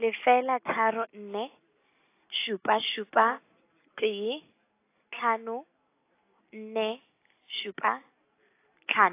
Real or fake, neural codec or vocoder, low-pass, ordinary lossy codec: real; none; 3.6 kHz; AAC, 24 kbps